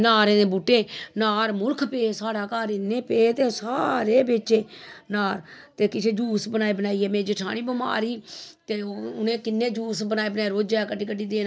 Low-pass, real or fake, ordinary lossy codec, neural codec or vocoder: none; real; none; none